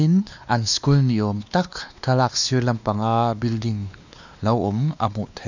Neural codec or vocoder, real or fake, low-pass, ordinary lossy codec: codec, 16 kHz, 2 kbps, X-Codec, WavLM features, trained on Multilingual LibriSpeech; fake; 7.2 kHz; none